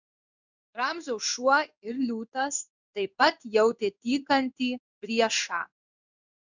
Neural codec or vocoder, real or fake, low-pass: codec, 16 kHz in and 24 kHz out, 1 kbps, XY-Tokenizer; fake; 7.2 kHz